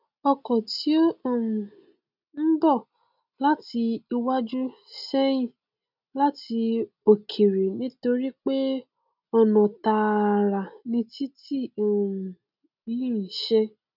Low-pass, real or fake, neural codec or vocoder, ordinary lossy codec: 5.4 kHz; real; none; none